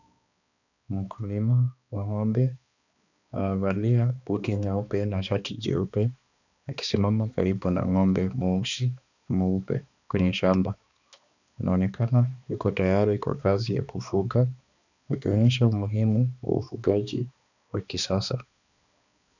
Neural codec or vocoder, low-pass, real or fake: codec, 16 kHz, 2 kbps, X-Codec, HuBERT features, trained on balanced general audio; 7.2 kHz; fake